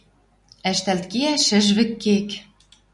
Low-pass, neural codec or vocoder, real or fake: 10.8 kHz; none; real